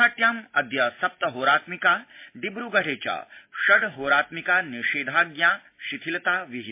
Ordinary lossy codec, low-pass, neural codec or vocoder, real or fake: MP3, 24 kbps; 3.6 kHz; none; real